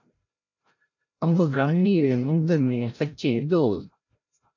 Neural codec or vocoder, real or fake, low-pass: codec, 16 kHz, 0.5 kbps, FreqCodec, larger model; fake; 7.2 kHz